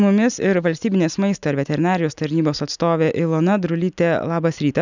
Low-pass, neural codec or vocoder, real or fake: 7.2 kHz; none; real